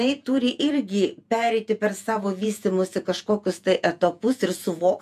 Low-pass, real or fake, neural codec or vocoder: 14.4 kHz; fake; vocoder, 48 kHz, 128 mel bands, Vocos